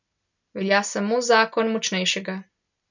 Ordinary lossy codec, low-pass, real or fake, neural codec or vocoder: none; 7.2 kHz; real; none